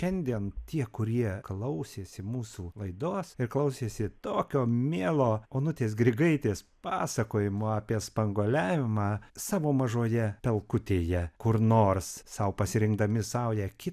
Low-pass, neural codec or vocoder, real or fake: 14.4 kHz; none; real